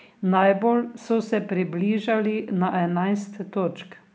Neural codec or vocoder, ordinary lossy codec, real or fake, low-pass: none; none; real; none